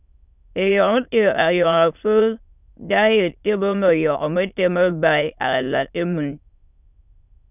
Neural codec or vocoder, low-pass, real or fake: autoencoder, 22.05 kHz, a latent of 192 numbers a frame, VITS, trained on many speakers; 3.6 kHz; fake